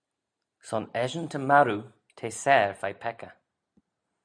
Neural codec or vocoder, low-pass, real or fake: none; 9.9 kHz; real